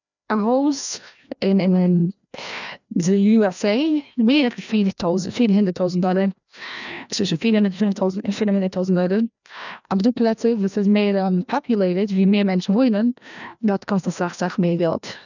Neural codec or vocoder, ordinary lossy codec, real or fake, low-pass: codec, 16 kHz, 1 kbps, FreqCodec, larger model; none; fake; 7.2 kHz